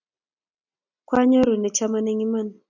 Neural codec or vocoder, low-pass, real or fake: none; 7.2 kHz; real